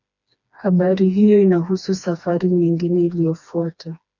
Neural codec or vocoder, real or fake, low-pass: codec, 16 kHz, 2 kbps, FreqCodec, smaller model; fake; 7.2 kHz